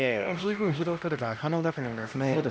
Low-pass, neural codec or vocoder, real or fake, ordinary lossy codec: none; codec, 16 kHz, 1 kbps, X-Codec, HuBERT features, trained on LibriSpeech; fake; none